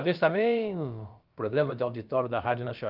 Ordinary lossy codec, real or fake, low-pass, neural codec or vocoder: Opus, 32 kbps; fake; 5.4 kHz; codec, 16 kHz, about 1 kbps, DyCAST, with the encoder's durations